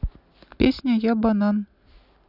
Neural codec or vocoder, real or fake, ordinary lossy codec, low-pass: none; real; none; 5.4 kHz